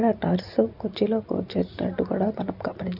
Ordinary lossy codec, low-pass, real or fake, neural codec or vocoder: none; 5.4 kHz; real; none